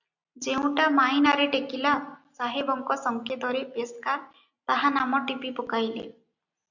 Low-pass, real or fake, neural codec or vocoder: 7.2 kHz; real; none